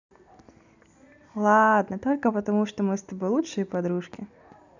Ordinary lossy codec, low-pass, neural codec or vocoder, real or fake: none; 7.2 kHz; none; real